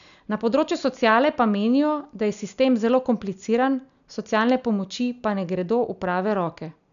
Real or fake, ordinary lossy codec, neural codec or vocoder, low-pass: real; none; none; 7.2 kHz